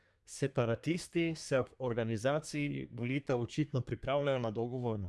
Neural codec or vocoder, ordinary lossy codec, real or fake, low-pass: codec, 24 kHz, 1 kbps, SNAC; none; fake; none